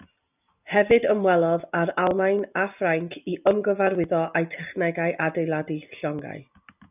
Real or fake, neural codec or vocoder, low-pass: real; none; 3.6 kHz